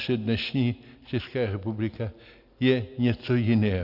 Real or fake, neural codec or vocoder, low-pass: real; none; 5.4 kHz